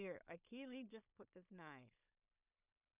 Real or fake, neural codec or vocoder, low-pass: fake; codec, 16 kHz in and 24 kHz out, 0.4 kbps, LongCat-Audio-Codec, two codebook decoder; 3.6 kHz